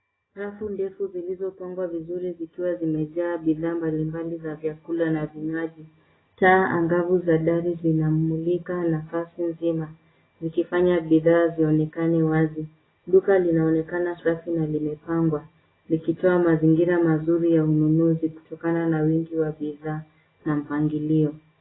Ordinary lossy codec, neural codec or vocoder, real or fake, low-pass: AAC, 16 kbps; none; real; 7.2 kHz